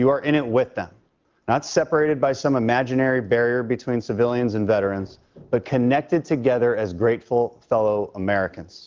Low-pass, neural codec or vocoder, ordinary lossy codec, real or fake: 7.2 kHz; none; Opus, 32 kbps; real